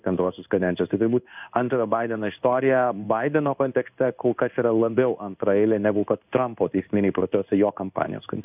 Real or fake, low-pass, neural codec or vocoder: fake; 3.6 kHz; codec, 16 kHz in and 24 kHz out, 1 kbps, XY-Tokenizer